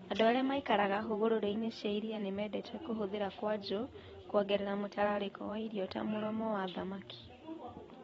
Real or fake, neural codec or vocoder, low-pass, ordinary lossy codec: fake; vocoder, 44.1 kHz, 128 mel bands every 512 samples, BigVGAN v2; 19.8 kHz; AAC, 24 kbps